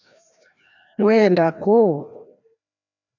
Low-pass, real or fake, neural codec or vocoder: 7.2 kHz; fake; codec, 16 kHz, 1 kbps, FreqCodec, larger model